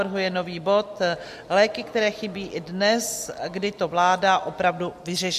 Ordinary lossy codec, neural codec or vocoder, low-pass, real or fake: MP3, 64 kbps; none; 14.4 kHz; real